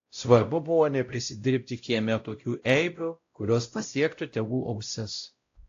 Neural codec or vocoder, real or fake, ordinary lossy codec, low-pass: codec, 16 kHz, 0.5 kbps, X-Codec, WavLM features, trained on Multilingual LibriSpeech; fake; AAC, 48 kbps; 7.2 kHz